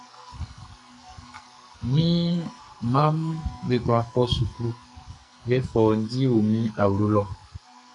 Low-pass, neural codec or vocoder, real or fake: 10.8 kHz; codec, 44.1 kHz, 2.6 kbps, SNAC; fake